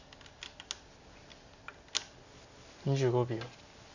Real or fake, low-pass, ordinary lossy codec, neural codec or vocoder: real; 7.2 kHz; none; none